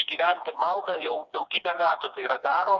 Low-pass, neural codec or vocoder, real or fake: 7.2 kHz; codec, 16 kHz, 2 kbps, FreqCodec, smaller model; fake